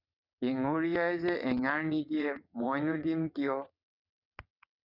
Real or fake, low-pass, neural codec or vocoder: fake; 5.4 kHz; vocoder, 22.05 kHz, 80 mel bands, WaveNeXt